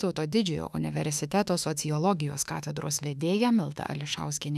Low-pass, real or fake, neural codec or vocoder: 14.4 kHz; fake; autoencoder, 48 kHz, 32 numbers a frame, DAC-VAE, trained on Japanese speech